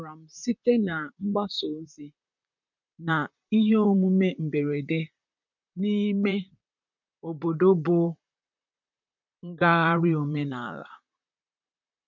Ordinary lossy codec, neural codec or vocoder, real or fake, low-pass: none; vocoder, 44.1 kHz, 128 mel bands, Pupu-Vocoder; fake; 7.2 kHz